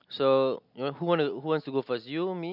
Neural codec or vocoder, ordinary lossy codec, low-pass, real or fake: none; none; 5.4 kHz; real